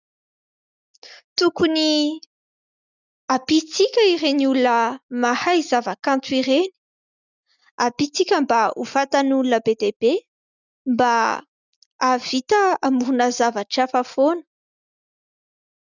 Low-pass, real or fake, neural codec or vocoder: 7.2 kHz; real; none